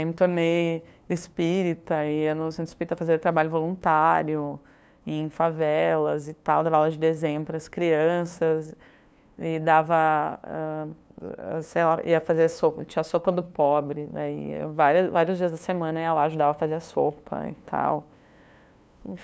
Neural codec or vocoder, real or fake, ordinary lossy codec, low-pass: codec, 16 kHz, 2 kbps, FunCodec, trained on LibriTTS, 25 frames a second; fake; none; none